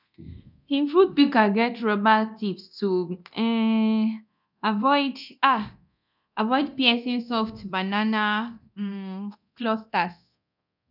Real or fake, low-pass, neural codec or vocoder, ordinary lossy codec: fake; 5.4 kHz; codec, 24 kHz, 0.9 kbps, DualCodec; none